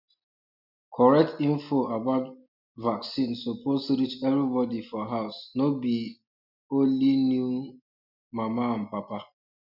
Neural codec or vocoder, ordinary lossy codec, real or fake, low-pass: none; none; real; 5.4 kHz